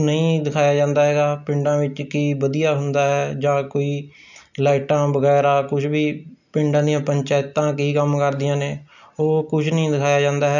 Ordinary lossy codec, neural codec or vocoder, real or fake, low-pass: none; none; real; 7.2 kHz